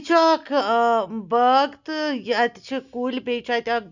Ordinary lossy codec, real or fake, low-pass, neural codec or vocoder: none; real; 7.2 kHz; none